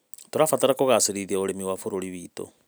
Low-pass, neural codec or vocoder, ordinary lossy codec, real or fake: none; none; none; real